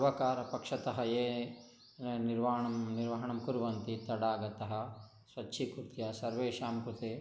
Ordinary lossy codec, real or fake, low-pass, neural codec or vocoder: none; real; none; none